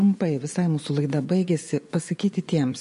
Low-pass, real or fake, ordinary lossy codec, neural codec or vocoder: 10.8 kHz; fake; MP3, 48 kbps; vocoder, 24 kHz, 100 mel bands, Vocos